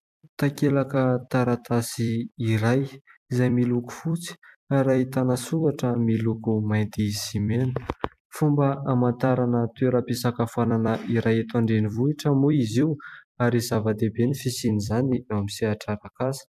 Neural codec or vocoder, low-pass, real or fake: vocoder, 44.1 kHz, 128 mel bands every 256 samples, BigVGAN v2; 14.4 kHz; fake